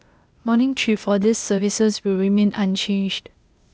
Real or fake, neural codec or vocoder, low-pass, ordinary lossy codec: fake; codec, 16 kHz, 0.8 kbps, ZipCodec; none; none